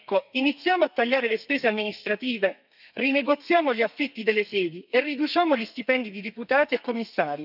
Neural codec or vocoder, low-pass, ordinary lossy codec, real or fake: codec, 32 kHz, 1.9 kbps, SNAC; 5.4 kHz; none; fake